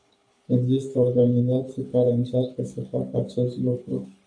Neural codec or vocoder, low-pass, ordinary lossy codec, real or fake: codec, 24 kHz, 6 kbps, HILCodec; 9.9 kHz; AAC, 64 kbps; fake